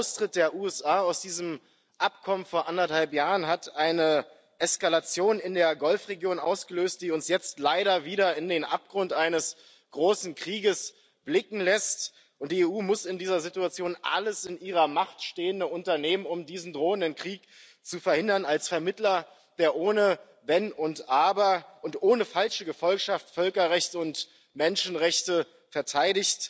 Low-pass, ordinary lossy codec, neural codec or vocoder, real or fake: none; none; none; real